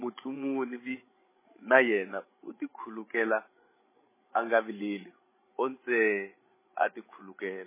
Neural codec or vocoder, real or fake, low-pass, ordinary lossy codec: codec, 16 kHz, 16 kbps, FreqCodec, larger model; fake; 3.6 kHz; MP3, 16 kbps